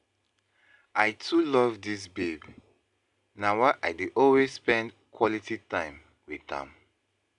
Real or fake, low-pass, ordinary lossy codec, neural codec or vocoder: real; 10.8 kHz; none; none